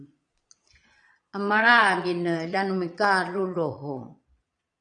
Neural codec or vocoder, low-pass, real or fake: vocoder, 22.05 kHz, 80 mel bands, Vocos; 9.9 kHz; fake